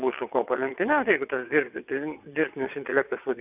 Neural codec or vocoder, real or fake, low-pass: vocoder, 22.05 kHz, 80 mel bands, WaveNeXt; fake; 3.6 kHz